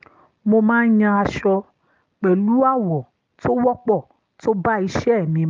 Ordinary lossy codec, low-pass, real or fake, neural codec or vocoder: Opus, 24 kbps; 7.2 kHz; real; none